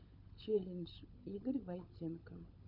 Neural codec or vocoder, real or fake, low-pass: codec, 16 kHz, 16 kbps, FunCodec, trained on LibriTTS, 50 frames a second; fake; 5.4 kHz